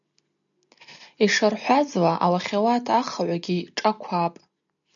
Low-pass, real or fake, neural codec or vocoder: 7.2 kHz; real; none